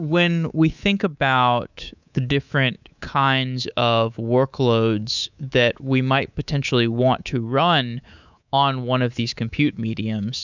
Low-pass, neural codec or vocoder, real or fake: 7.2 kHz; codec, 24 kHz, 3.1 kbps, DualCodec; fake